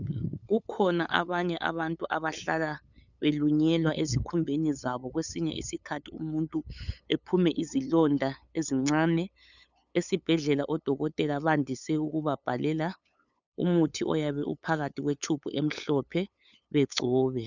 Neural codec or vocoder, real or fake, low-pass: codec, 16 kHz, 8 kbps, FunCodec, trained on LibriTTS, 25 frames a second; fake; 7.2 kHz